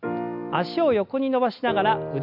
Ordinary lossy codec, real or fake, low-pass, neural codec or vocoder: AAC, 48 kbps; real; 5.4 kHz; none